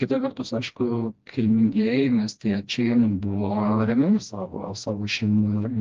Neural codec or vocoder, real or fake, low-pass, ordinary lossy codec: codec, 16 kHz, 1 kbps, FreqCodec, smaller model; fake; 7.2 kHz; Opus, 32 kbps